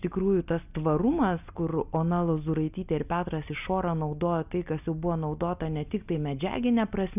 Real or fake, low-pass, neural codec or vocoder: real; 3.6 kHz; none